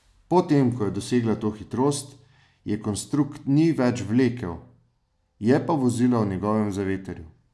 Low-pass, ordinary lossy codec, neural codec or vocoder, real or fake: none; none; none; real